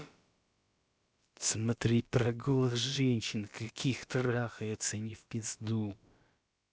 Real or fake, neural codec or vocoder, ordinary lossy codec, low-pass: fake; codec, 16 kHz, about 1 kbps, DyCAST, with the encoder's durations; none; none